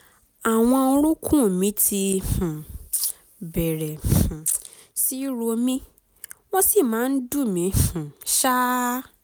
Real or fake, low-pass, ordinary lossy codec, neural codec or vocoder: real; none; none; none